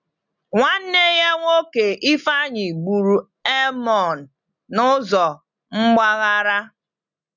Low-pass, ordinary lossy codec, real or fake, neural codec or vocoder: 7.2 kHz; none; real; none